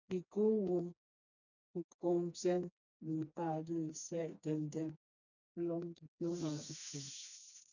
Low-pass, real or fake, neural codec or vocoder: 7.2 kHz; fake; codec, 16 kHz, 2 kbps, FreqCodec, smaller model